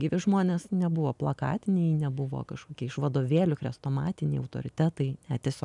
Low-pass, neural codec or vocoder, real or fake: 10.8 kHz; none; real